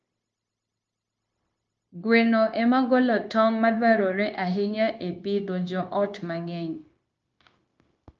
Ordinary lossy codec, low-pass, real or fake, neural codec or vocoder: Opus, 24 kbps; 7.2 kHz; fake; codec, 16 kHz, 0.9 kbps, LongCat-Audio-Codec